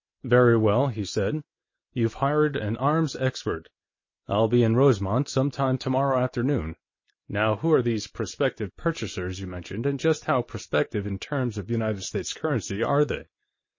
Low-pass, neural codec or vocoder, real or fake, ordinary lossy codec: 7.2 kHz; none; real; MP3, 32 kbps